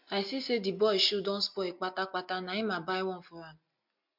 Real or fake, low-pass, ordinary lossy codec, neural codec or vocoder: real; 5.4 kHz; MP3, 48 kbps; none